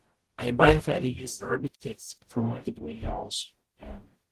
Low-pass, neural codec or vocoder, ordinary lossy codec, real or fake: 14.4 kHz; codec, 44.1 kHz, 0.9 kbps, DAC; Opus, 16 kbps; fake